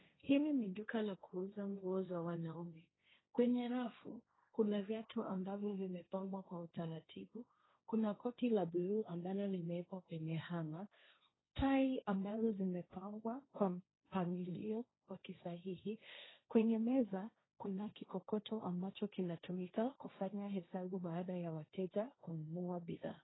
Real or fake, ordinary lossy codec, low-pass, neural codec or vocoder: fake; AAC, 16 kbps; 7.2 kHz; codec, 16 kHz, 1.1 kbps, Voila-Tokenizer